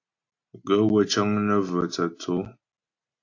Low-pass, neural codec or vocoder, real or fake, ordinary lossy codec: 7.2 kHz; none; real; AAC, 48 kbps